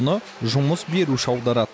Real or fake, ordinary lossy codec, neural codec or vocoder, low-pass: real; none; none; none